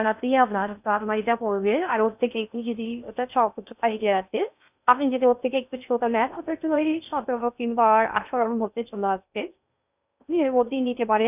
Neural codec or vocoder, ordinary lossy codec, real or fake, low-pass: codec, 16 kHz in and 24 kHz out, 0.6 kbps, FocalCodec, streaming, 4096 codes; none; fake; 3.6 kHz